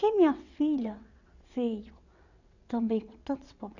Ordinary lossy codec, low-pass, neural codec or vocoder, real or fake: none; 7.2 kHz; vocoder, 22.05 kHz, 80 mel bands, WaveNeXt; fake